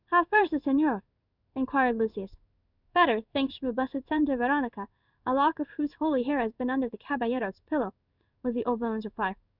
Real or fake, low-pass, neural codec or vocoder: fake; 5.4 kHz; codec, 16 kHz in and 24 kHz out, 1 kbps, XY-Tokenizer